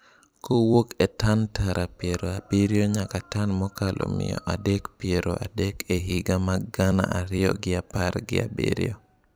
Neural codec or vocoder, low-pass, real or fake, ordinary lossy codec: vocoder, 44.1 kHz, 128 mel bands every 256 samples, BigVGAN v2; none; fake; none